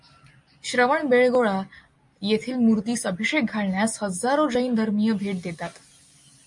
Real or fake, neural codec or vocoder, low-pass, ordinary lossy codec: real; none; 10.8 kHz; MP3, 48 kbps